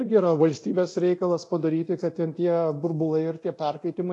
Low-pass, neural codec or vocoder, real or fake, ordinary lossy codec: 10.8 kHz; codec, 24 kHz, 0.9 kbps, DualCodec; fake; AAC, 48 kbps